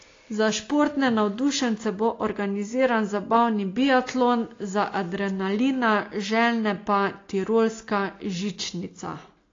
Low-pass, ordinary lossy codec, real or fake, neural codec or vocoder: 7.2 kHz; AAC, 32 kbps; real; none